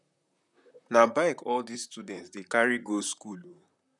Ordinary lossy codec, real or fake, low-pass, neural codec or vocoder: none; fake; 10.8 kHz; vocoder, 44.1 kHz, 128 mel bands, Pupu-Vocoder